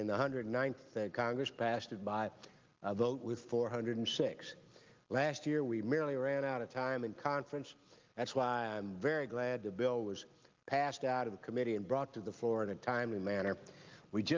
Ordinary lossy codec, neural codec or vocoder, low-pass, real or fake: Opus, 32 kbps; none; 7.2 kHz; real